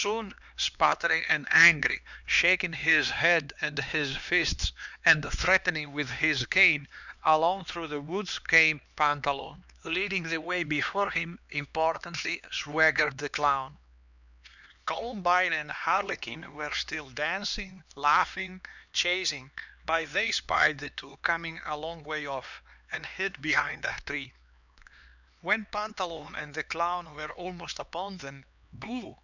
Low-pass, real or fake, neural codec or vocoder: 7.2 kHz; fake; codec, 16 kHz, 2 kbps, X-Codec, HuBERT features, trained on LibriSpeech